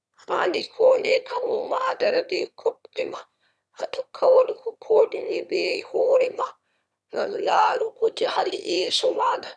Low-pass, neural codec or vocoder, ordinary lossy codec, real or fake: none; autoencoder, 22.05 kHz, a latent of 192 numbers a frame, VITS, trained on one speaker; none; fake